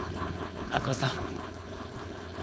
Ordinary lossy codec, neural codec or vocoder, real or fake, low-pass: none; codec, 16 kHz, 4.8 kbps, FACodec; fake; none